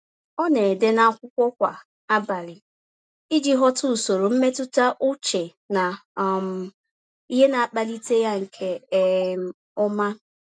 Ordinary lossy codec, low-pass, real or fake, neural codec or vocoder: none; none; real; none